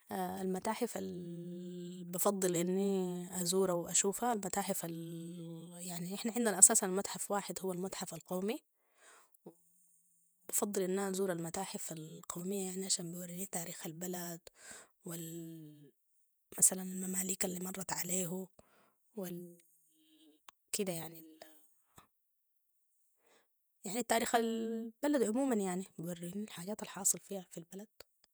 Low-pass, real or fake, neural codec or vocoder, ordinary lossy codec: none; fake; vocoder, 48 kHz, 128 mel bands, Vocos; none